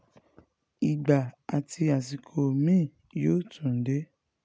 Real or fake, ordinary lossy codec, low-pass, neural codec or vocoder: real; none; none; none